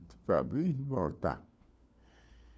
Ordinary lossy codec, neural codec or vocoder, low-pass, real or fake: none; codec, 16 kHz, 4 kbps, FunCodec, trained on LibriTTS, 50 frames a second; none; fake